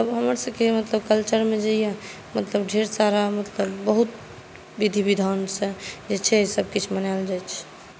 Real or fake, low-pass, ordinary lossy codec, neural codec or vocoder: real; none; none; none